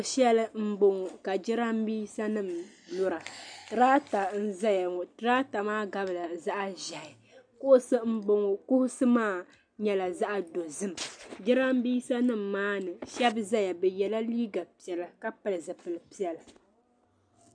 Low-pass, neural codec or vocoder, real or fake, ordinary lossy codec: 9.9 kHz; none; real; MP3, 96 kbps